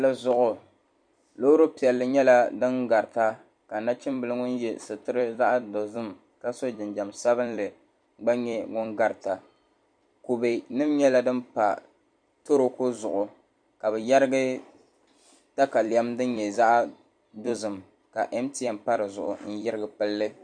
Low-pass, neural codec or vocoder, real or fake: 9.9 kHz; vocoder, 44.1 kHz, 128 mel bands every 256 samples, BigVGAN v2; fake